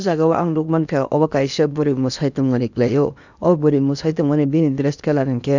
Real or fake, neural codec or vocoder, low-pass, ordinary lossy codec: fake; codec, 16 kHz in and 24 kHz out, 0.8 kbps, FocalCodec, streaming, 65536 codes; 7.2 kHz; none